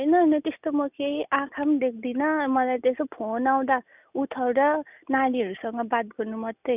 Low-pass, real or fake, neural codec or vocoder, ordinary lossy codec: 3.6 kHz; real; none; none